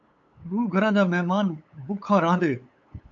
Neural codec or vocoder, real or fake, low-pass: codec, 16 kHz, 8 kbps, FunCodec, trained on LibriTTS, 25 frames a second; fake; 7.2 kHz